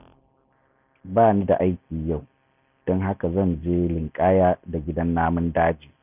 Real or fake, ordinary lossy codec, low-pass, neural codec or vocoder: real; none; 3.6 kHz; none